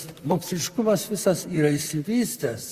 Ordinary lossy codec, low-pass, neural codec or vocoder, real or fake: Opus, 64 kbps; 14.4 kHz; vocoder, 44.1 kHz, 128 mel bands, Pupu-Vocoder; fake